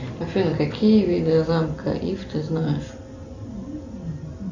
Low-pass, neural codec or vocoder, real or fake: 7.2 kHz; none; real